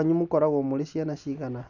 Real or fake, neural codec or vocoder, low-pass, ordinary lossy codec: real; none; 7.2 kHz; none